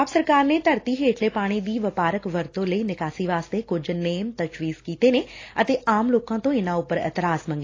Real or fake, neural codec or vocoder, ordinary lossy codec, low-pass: real; none; AAC, 32 kbps; 7.2 kHz